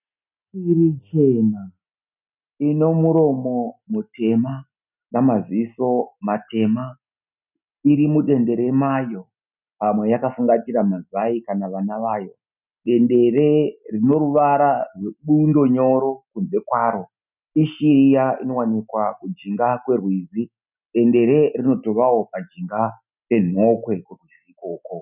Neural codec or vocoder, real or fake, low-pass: none; real; 3.6 kHz